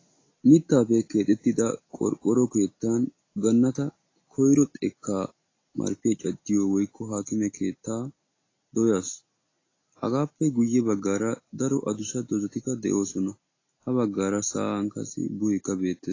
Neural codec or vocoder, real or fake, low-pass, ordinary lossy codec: none; real; 7.2 kHz; AAC, 32 kbps